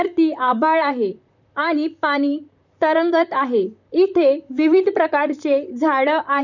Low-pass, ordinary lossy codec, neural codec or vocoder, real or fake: 7.2 kHz; none; vocoder, 44.1 kHz, 128 mel bands, Pupu-Vocoder; fake